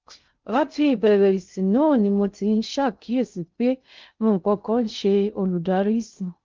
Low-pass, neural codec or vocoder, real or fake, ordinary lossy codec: 7.2 kHz; codec, 16 kHz in and 24 kHz out, 0.6 kbps, FocalCodec, streaming, 2048 codes; fake; Opus, 32 kbps